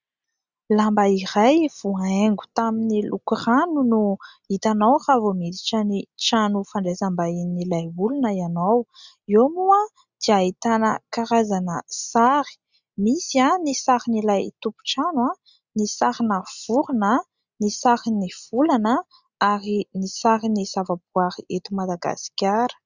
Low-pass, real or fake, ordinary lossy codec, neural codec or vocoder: 7.2 kHz; real; Opus, 64 kbps; none